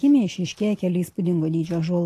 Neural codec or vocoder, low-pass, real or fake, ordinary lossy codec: none; 14.4 kHz; real; AAC, 48 kbps